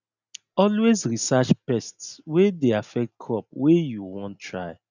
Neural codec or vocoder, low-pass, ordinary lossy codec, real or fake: none; 7.2 kHz; none; real